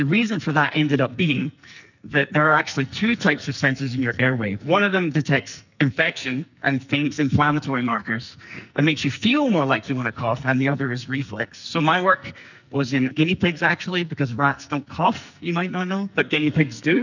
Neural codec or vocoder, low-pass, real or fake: codec, 44.1 kHz, 2.6 kbps, SNAC; 7.2 kHz; fake